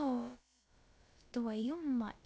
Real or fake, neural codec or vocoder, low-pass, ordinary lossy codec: fake; codec, 16 kHz, about 1 kbps, DyCAST, with the encoder's durations; none; none